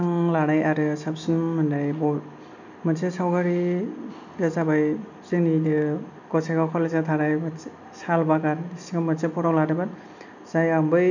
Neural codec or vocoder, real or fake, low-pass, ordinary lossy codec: none; real; 7.2 kHz; none